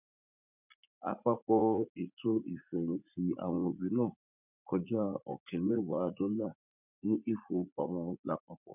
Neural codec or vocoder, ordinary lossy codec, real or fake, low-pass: vocoder, 44.1 kHz, 80 mel bands, Vocos; none; fake; 3.6 kHz